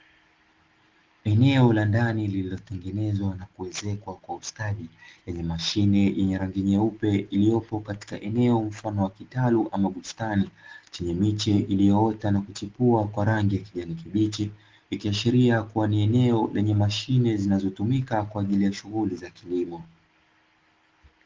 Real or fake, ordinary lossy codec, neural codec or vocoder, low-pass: real; Opus, 16 kbps; none; 7.2 kHz